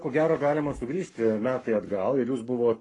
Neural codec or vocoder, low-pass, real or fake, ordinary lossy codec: codec, 44.1 kHz, 7.8 kbps, Pupu-Codec; 10.8 kHz; fake; AAC, 32 kbps